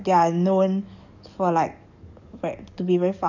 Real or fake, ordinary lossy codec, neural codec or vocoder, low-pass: fake; none; codec, 44.1 kHz, 7.8 kbps, DAC; 7.2 kHz